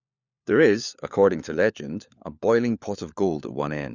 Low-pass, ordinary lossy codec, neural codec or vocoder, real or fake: 7.2 kHz; none; codec, 16 kHz, 4 kbps, FunCodec, trained on LibriTTS, 50 frames a second; fake